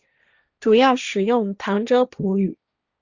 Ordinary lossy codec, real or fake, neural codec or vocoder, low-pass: Opus, 64 kbps; fake; codec, 16 kHz, 1.1 kbps, Voila-Tokenizer; 7.2 kHz